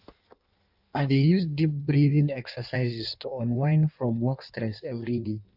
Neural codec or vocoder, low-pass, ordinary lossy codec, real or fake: codec, 16 kHz in and 24 kHz out, 1.1 kbps, FireRedTTS-2 codec; 5.4 kHz; AAC, 48 kbps; fake